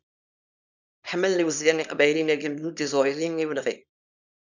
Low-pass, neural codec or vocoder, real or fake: 7.2 kHz; codec, 24 kHz, 0.9 kbps, WavTokenizer, small release; fake